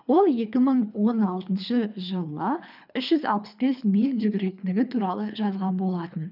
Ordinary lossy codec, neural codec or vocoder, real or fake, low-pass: none; codec, 24 kHz, 3 kbps, HILCodec; fake; 5.4 kHz